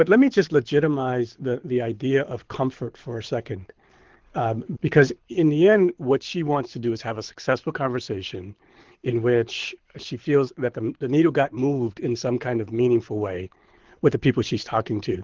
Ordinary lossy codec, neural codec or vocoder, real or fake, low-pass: Opus, 16 kbps; codec, 24 kHz, 6 kbps, HILCodec; fake; 7.2 kHz